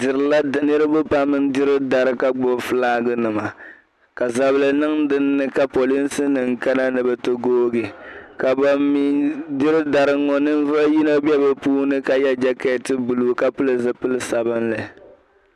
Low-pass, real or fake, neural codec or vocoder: 14.4 kHz; real; none